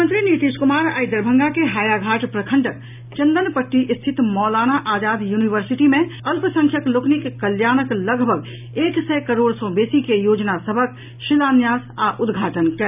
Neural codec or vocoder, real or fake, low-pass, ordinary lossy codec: none; real; 3.6 kHz; none